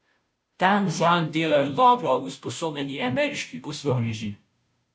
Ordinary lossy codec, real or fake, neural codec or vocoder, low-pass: none; fake; codec, 16 kHz, 0.5 kbps, FunCodec, trained on Chinese and English, 25 frames a second; none